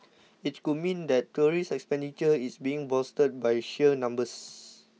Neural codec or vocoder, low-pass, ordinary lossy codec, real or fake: none; none; none; real